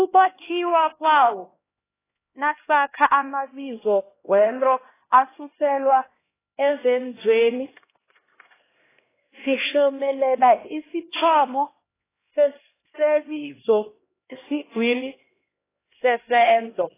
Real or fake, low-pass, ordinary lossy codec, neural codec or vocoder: fake; 3.6 kHz; AAC, 16 kbps; codec, 16 kHz, 1 kbps, X-Codec, HuBERT features, trained on LibriSpeech